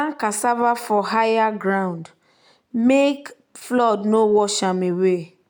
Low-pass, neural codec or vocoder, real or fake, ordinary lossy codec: none; none; real; none